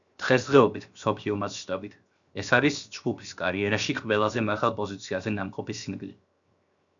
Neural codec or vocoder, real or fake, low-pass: codec, 16 kHz, 0.7 kbps, FocalCodec; fake; 7.2 kHz